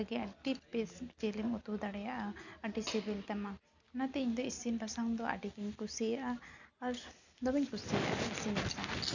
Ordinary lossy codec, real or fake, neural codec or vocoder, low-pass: none; real; none; 7.2 kHz